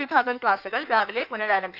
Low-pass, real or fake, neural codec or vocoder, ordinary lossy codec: 5.4 kHz; fake; codec, 16 kHz in and 24 kHz out, 1.1 kbps, FireRedTTS-2 codec; none